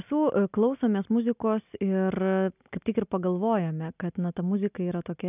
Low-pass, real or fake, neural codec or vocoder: 3.6 kHz; real; none